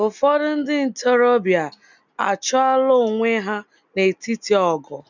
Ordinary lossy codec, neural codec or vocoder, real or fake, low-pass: none; none; real; 7.2 kHz